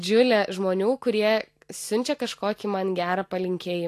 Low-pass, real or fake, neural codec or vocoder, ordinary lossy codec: 14.4 kHz; real; none; AAC, 64 kbps